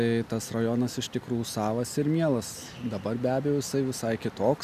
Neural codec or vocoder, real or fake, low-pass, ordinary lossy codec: none; real; 14.4 kHz; MP3, 96 kbps